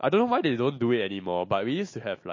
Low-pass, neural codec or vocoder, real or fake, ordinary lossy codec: 7.2 kHz; none; real; MP3, 32 kbps